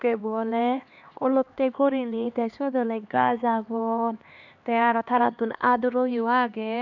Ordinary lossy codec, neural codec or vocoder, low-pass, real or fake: none; codec, 16 kHz, 2 kbps, X-Codec, HuBERT features, trained on LibriSpeech; 7.2 kHz; fake